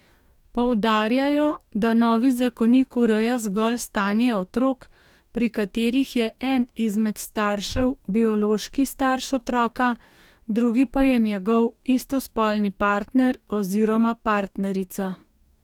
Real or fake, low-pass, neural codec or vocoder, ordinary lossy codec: fake; 19.8 kHz; codec, 44.1 kHz, 2.6 kbps, DAC; none